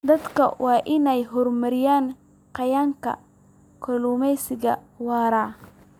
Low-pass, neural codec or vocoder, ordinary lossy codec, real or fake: 19.8 kHz; none; none; real